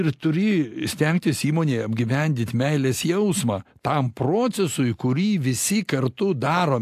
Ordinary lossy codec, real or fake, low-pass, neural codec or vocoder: AAC, 64 kbps; real; 14.4 kHz; none